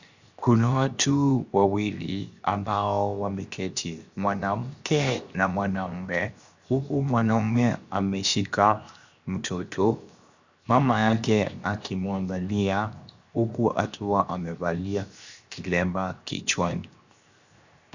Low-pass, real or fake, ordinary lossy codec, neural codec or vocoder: 7.2 kHz; fake; Opus, 64 kbps; codec, 16 kHz, 0.7 kbps, FocalCodec